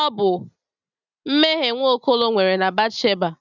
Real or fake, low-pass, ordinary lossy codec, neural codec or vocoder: real; 7.2 kHz; none; none